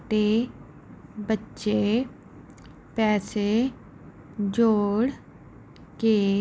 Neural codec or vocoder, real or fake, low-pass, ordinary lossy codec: none; real; none; none